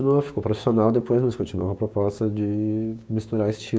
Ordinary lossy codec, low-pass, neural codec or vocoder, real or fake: none; none; codec, 16 kHz, 6 kbps, DAC; fake